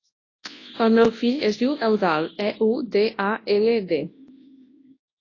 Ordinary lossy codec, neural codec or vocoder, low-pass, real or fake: AAC, 32 kbps; codec, 24 kHz, 0.9 kbps, WavTokenizer, large speech release; 7.2 kHz; fake